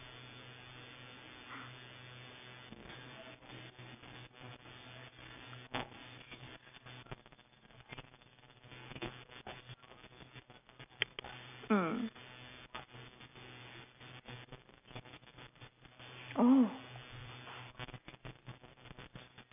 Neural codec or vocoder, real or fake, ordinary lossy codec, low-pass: none; real; none; 3.6 kHz